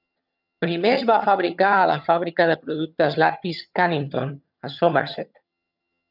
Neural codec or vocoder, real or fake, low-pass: vocoder, 22.05 kHz, 80 mel bands, HiFi-GAN; fake; 5.4 kHz